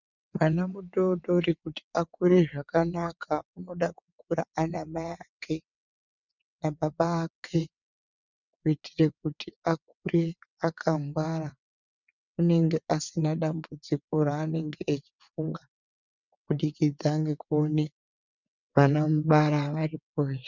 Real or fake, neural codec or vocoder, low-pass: fake; vocoder, 22.05 kHz, 80 mel bands, WaveNeXt; 7.2 kHz